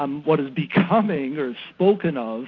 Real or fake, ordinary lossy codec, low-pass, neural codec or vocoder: real; AAC, 32 kbps; 7.2 kHz; none